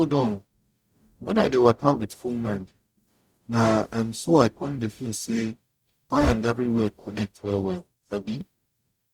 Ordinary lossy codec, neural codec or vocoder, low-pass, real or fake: Opus, 64 kbps; codec, 44.1 kHz, 0.9 kbps, DAC; 19.8 kHz; fake